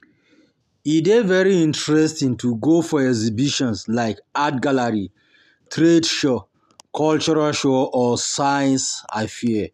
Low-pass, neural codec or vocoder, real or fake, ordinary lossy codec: 14.4 kHz; none; real; none